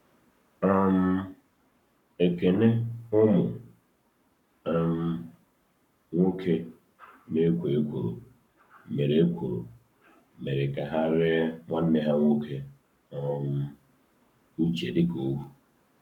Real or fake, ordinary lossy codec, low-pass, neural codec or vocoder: fake; none; 19.8 kHz; codec, 44.1 kHz, 7.8 kbps, Pupu-Codec